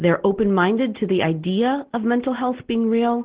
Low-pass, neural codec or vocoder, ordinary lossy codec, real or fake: 3.6 kHz; none; Opus, 16 kbps; real